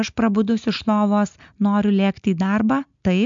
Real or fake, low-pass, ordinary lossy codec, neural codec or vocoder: real; 7.2 kHz; MP3, 64 kbps; none